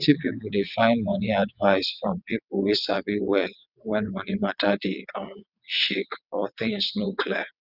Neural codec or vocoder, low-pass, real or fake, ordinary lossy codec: vocoder, 22.05 kHz, 80 mel bands, WaveNeXt; 5.4 kHz; fake; none